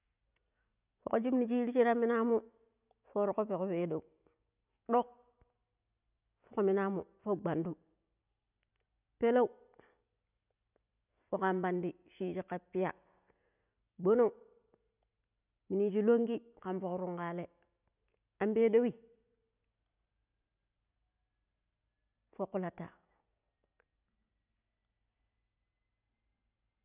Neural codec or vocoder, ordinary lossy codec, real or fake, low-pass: none; none; real; 3.6 kHz